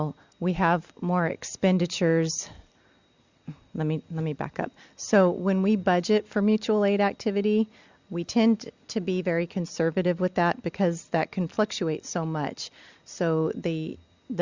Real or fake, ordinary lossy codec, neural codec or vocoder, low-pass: real; Opus, 64 kbps; none; 7.2 kHz